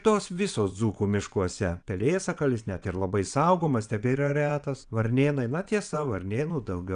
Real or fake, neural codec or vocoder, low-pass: fake; vocoder, 22.05 kHz, 80 mel bands, WaveNeXt; 9.9 kHz